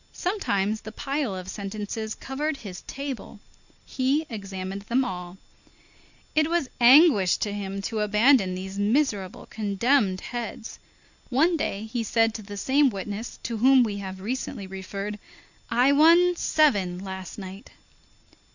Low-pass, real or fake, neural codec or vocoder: 7.2 kHz; real; none